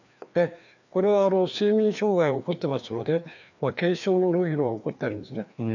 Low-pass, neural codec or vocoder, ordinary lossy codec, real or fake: 7.2 kHz; codec, 16 kHz, 2 kbps, FreqCodec, larger model; none; fake